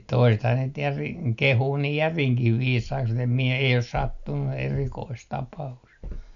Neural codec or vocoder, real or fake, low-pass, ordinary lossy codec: none; real; 7.2 kHz; none